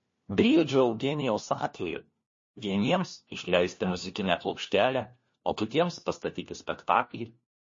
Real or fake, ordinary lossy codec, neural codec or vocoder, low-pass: fake; MP3, 32 kbps; codec, 16 kHz, 1 kbps, FunCodec, trained on LibriTTS, 50 frames a second; 7.2 kHz